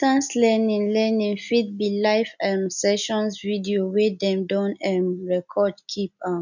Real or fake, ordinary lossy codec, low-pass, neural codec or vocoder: real; none; 7.2 kHz; none